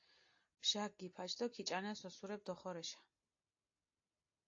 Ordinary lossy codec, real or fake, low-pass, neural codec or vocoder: AAC, 48 kbps; real; 7.2 kHz; none